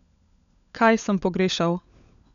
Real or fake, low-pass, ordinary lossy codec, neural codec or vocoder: fake; 7.2 kHz; none; codec, 16 kHz, 16 kbps, FunCodec, trained on LibriTTS, 50 frames a second